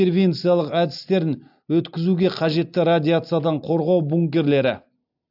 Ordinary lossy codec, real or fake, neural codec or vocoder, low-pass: AAC, 48 kbps; real; none; 5.4 kHz